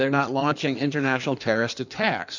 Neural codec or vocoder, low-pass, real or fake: codec, 16 kHz in and 24 kHz out, 1.1 kbps, FireRedTTS-2 codec; 7.2 kHz; fake